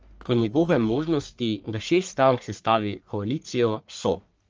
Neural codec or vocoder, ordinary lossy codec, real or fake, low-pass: codec, 44.1 kHz, 1.7 kbps, Pupu-Codec; Opus, 24 kbps; fake; 7.2 kHz